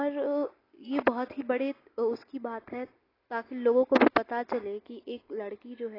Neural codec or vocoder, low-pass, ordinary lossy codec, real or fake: none; 5.4 kHz; AAC, 24 kbps; real